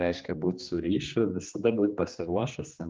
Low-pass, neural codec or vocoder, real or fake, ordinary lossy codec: 7.2 kHz; codec, 16 kHz, 2 kbps, X-Codec, HuBERT features, trained on general audio; fake; Opus, 24 kbps